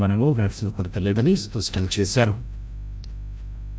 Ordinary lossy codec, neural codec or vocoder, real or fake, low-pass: none; codec, 16 kHz, 0.5 kbps, FreqCodec, larger model; fake; none